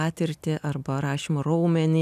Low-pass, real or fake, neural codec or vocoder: 14.4 kHz; real; none